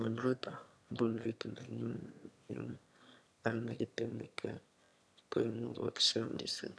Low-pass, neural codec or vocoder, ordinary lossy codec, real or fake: none; autoencoder, 22.05 kHz, a latent of 192 numbers a frame, VITS, trained on one speaker; none; fake